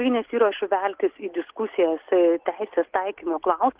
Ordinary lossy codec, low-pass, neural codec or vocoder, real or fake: Opus, 16 kbps; 3.6 kHz; none; real